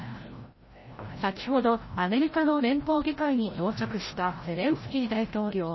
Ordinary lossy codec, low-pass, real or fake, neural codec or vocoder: MP3, 24 kbps; 7.2 kHz; fake; codec, 16 kHz, 0.5 kbps, FreqCodec, larger model